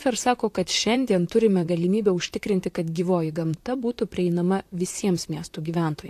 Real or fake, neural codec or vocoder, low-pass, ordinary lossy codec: fake; vocoder, 44.1 kHz, 128 mel bands, Pupu-Vocoder; 14.4 kHz; AAC, 64 kbps